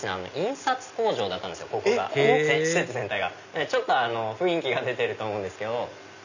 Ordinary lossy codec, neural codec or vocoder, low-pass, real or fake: none; none; 7.2 kHz; real